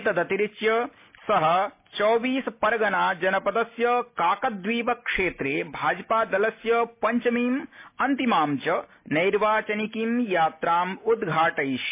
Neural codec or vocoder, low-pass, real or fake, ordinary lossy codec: none; 3.6 kHz; real; MP3, 24 kbps